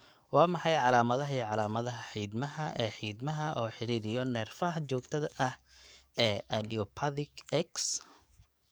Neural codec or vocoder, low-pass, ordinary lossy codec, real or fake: codec, 44.1 kHz, 7.8 kbps, DAC; none; none; fake